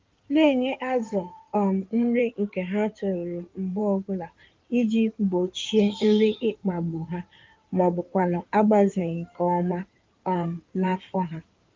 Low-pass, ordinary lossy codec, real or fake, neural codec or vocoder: 7.2 kHz; Opus, 32 kbps; fake; codec, 16 kHz in and 24 kHz out, 2.2 kbps, FireRedTTS-2 codec